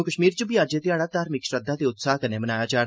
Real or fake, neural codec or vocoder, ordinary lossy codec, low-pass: real; none; none; none